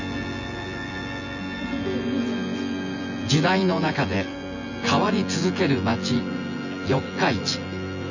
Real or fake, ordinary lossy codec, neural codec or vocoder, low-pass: fake; none; vocoder, 24 kHz, 100 mel bands, Vocos; 7.2 kHz